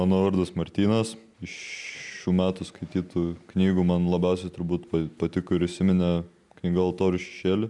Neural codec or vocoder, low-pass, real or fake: none; 10.8 kHz; real